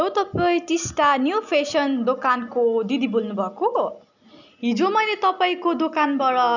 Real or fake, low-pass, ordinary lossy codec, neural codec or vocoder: real; 7.2 kHz; none; none